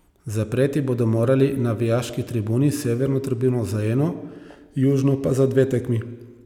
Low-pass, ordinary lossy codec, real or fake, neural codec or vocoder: 19.8 kHz; none; real; none